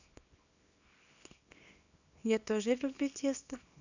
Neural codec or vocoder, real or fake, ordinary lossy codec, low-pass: codec, 24 kHz, 0.9 kbps, WavTokenizer, small release; fake; none; 7.2 kHz